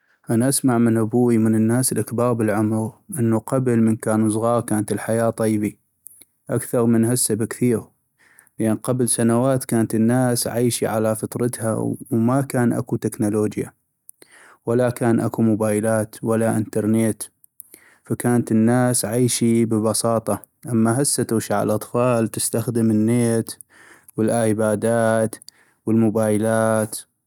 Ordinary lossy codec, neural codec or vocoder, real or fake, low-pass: none; none; real; 19.8 kHz